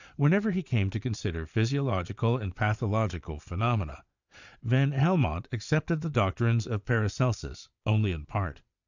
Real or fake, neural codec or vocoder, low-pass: fake; vocoder, 22.05 kHz, 80 mel bands, Vocos; 7.2 kHz